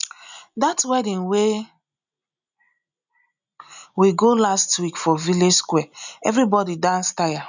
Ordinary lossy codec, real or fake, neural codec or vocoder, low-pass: none; real; none; 7.2 kHz